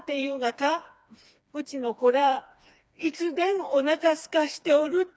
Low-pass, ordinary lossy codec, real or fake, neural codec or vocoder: none; none; fake; codec, 16 kHz, 2 kbps, FreqCodec, smaller model